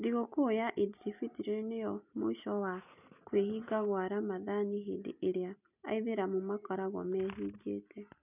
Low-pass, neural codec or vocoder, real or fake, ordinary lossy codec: 3.6 kHz; none; real; none